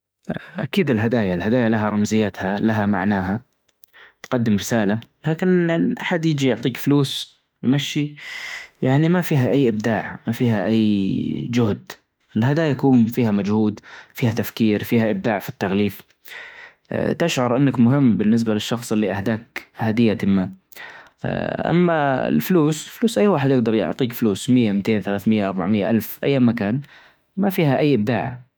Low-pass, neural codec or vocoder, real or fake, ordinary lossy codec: none; autoencoder, 48 kHz, 32 numbers a frame, DAC-VAE, trained on Japanese speech; fake; none